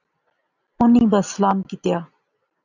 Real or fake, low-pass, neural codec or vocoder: real; 7.2 kHz; none